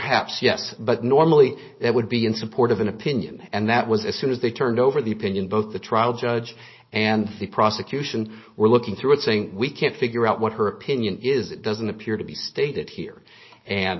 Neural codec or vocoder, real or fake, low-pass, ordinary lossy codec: none; real; 7.2 kHz; MP3, 24 kbps